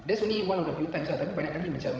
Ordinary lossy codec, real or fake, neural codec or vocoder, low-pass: none; fake; codec, 16 kHz, 16 kbps, FreqCodec, larger model; none